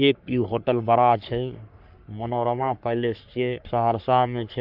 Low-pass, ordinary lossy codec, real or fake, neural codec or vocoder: 5.4 kHz; none; fake; codec, 44.1 kHz, 3.4 kbps, Pupu-Codec